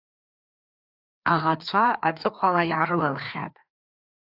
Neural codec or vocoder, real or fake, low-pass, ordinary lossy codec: codec, 16 kHz, 2 kbps, FreqCodec, larger model; fake; 5.4 kHz; AAC, 48 kbps